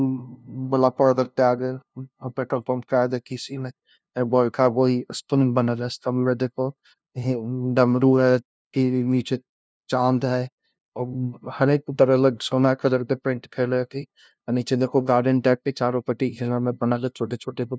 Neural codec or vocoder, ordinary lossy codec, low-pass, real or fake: codec, 16 kHz, 0.5 kbps, FunCodec, trained on LibriTTS, 25 frames a second; none; none; fake